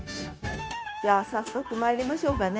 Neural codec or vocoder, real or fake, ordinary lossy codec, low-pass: codec, 16 kHz, 0.9 kbps, LongCat-Audio-Codec; fake; none; none